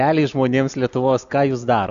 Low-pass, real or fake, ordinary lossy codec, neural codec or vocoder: 7.2 kHz; real; AAC, 96 kbps; none